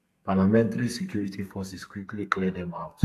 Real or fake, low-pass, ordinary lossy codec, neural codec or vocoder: fake; 14.4 kHz; AAC, 64 kbps; codec, 32 kHz, 1.9 kbps, SNAC